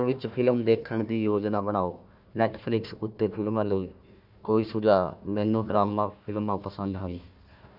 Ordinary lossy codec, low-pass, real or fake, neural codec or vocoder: none; 5.4 kHz; fake; codec, 16 kHz, 1 kbps, FunCodec, trained on Chinese and English, 50 frames a second